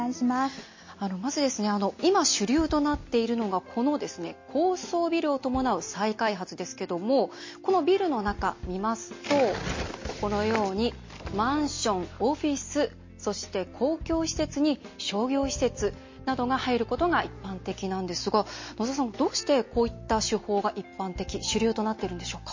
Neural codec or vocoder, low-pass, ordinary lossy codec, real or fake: none; 7.2 kHz; MP3, 32 kbps; real